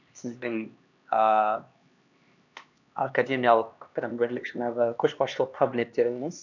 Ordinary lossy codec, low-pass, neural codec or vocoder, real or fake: none; 7.2 kHz; codec, 16 kHz, 2 kbps, X-Codec, HuBERT features, trained on LibriSpeech; fake